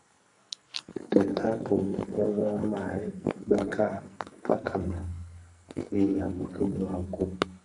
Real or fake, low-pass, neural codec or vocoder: fake; 10.8 kHz; codec, 44.1 kHz, 2.6 kbps, SNAC